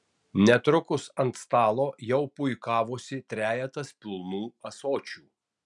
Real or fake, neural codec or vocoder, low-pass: real; none; 10.8 kHz